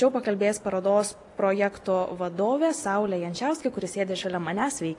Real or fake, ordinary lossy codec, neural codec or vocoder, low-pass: real; AAC, 48 kbps; none; 10.8 kHz